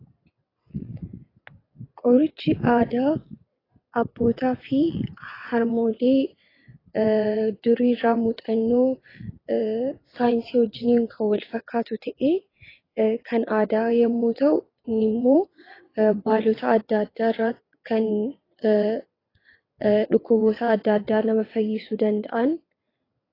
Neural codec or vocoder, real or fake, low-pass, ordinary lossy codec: vocoder, 22.05 kHz, 80 mel bands, WaveNeXt; fake; 5.4 kHz; AAC, 24 kbps